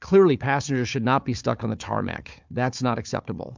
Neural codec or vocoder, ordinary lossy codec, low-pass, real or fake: codec, 16 kHz, 4 kbps, FunCodec, trained on Chinese and English, 50 frames a second; MP3, 64 kbps; 7.2 kHz; fake